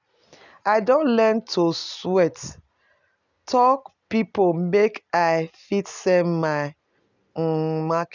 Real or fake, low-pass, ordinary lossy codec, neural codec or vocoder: real; 7.2 kHz; none; none